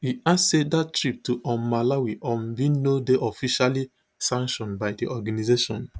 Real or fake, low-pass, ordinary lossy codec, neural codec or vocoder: real; none; none; none